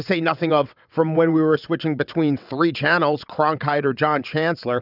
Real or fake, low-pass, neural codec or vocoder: fake; 5.4 kHz; vocoder, 22.05 kHz, 80 mel bands, WaveNeXt